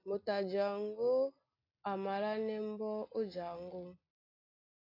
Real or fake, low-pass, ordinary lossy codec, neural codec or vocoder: real; 5.4 kHz; AAC, 24 kbps; none